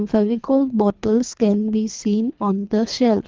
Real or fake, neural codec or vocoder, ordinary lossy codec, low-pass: fake; codec, 24 kHz, 3 kbps, HILCodec; Opus, 32 kbps; 7.2 kHz